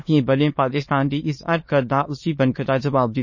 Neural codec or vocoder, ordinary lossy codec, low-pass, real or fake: autoencoder, 22.05 kHz, a latent of 192 numbers a frame, VITS, trained on many speakers; MP3, 32 kbps; 7.2 kHz; fake